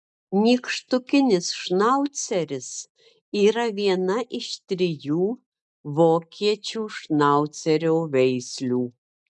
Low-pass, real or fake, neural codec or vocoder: 10.8 kHz; real; none